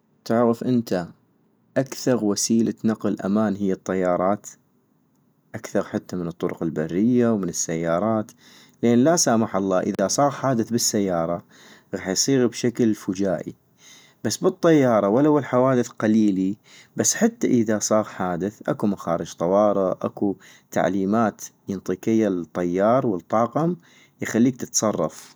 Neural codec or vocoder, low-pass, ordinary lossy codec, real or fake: none; none; none; real